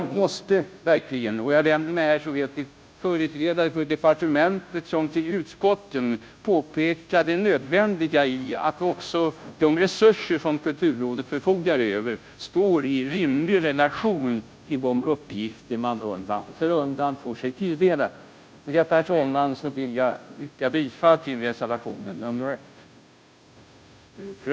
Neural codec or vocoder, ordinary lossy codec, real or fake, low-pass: codec, 16 kHz, 0.5 kbps, FunCodec, trained on Chinese and English, 25 frames a second; none; fake; none